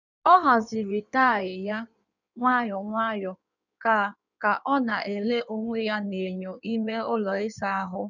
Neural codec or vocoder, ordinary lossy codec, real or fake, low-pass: codec, 16 kHz in and 24 kHz out, 1.1 kbps, FireRedTTS-2 codec; none; fake; 7.2 kHz